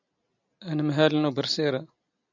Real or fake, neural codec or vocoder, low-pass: real; none; 7.2 kHz